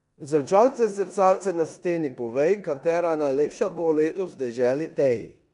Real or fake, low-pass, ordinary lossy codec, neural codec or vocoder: fake; 10.8 kHz; none; codec, 16 kHz in and 24 kHz out, 0.9 kbps, LongCat-Audio-Codec, four codebook decoder